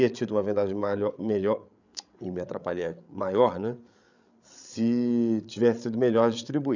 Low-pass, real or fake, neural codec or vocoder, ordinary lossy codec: 7.2 kHz; fake; codec, 16 kHz, 8 kbps, FreqCodec, larger model; none